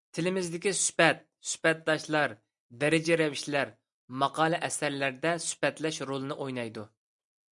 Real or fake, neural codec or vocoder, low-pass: real; none; 10.8 kHz